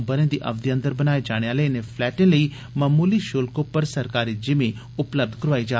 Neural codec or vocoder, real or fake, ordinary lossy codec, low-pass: none; real; none; none